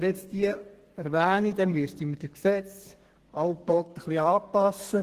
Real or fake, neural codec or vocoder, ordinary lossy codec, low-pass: fake; codec, 32 kHz, 1.9 kbps, SNAC; Opus, 16 kbps; 14.4 kHz